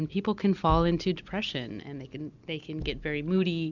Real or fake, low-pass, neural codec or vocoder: real; 7.2 kHz; none